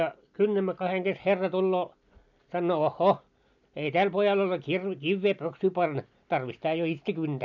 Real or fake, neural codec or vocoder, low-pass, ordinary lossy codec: real; none; 7.2 kHz; none